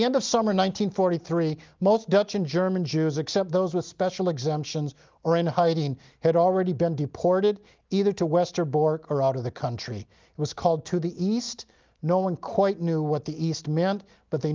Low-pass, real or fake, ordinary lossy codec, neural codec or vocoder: 7.2 kHz; real; Opus, 32 kbps; none